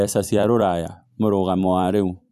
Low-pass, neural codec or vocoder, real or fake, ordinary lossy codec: 14.4 kHz; vocoder, 44.1 kHz, 128 mel bands every 256 samples, BigVGAN v2; fake; none